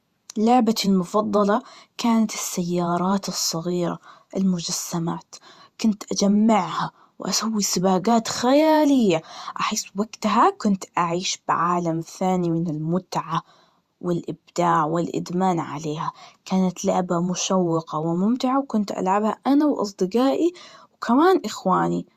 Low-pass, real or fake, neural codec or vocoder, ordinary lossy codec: 14.4 kHz; fake; vocoder, 48 kHz, 128 mel bands, Vocos; Opus, 64 kbps